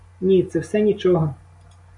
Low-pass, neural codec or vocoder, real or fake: 10.8 kHz; none; real